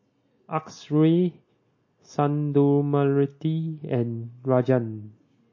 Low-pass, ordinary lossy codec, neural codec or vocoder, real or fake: 7.2 kHz; MP3, 32 kbps; none; real